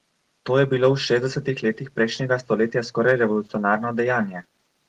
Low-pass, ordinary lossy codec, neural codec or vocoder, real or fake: 14.4 kHz; Opus, 16 kbps; none; real